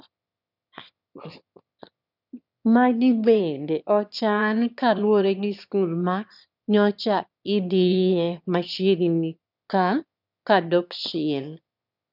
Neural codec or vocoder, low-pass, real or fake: autoencoder, 22.05 kHz, a latent of 192 numbers a frame, VITS, trained on one speaker; 5.4 kHz; fake